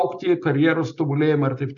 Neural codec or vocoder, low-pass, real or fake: none; 7.2 kHz; real